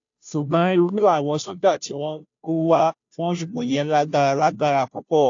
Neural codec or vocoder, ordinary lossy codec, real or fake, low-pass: codec, 16 kHz, 0.5 kbps, FunCodec, trained on Chinese and English, 25 frames a second; AAC, 48 kbps; fake; 7.2 kHz